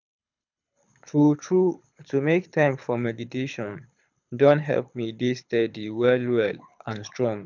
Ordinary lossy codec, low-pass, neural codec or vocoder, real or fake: none; 7.2 kHz; codec, 24 kHz, 6 kbps, HILCodec; fake